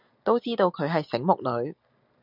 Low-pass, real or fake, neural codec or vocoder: 5.4 kHz; real; none